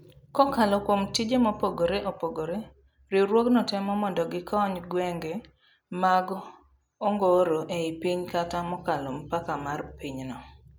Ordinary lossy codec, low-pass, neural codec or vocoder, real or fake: none; none; none; real